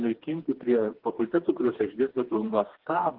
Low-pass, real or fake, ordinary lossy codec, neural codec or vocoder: 5.4 kHz; fake; Opus, 16 kbps; codec, 16 kHz, 2 kbps, FreqCodec, smaller model